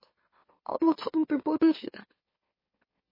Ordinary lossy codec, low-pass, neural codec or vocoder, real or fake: MP3, 24 kbps; 5.4 kHz; autoencoder, 44.1 kHz, a latent of 192 numbers a frame, MeloTTS; fake